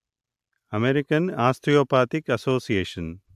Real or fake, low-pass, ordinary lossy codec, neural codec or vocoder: real; 14.4 kHz; AAC, 96 kbps; none